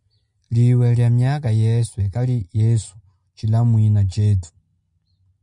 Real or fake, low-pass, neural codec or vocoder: real; 10.8 kHz; none